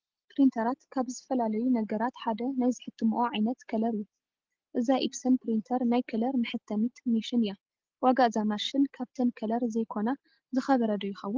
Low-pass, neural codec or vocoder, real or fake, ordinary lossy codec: 7.2 kHz; none; real; Opus, 16 kbps